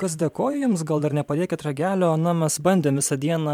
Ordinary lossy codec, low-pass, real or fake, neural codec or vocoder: MP3, 96 kbps; 19.8 kHz; fake; vocoder, 44.1 kHz, 128 mel bands every 512 samples, BigVGAN v2